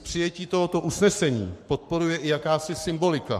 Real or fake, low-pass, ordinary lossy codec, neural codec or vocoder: fake; 14.4 kHz; MP3, 64 kbps; codec, 44.1 kHz, 7.8 kbps, Pupu-Codec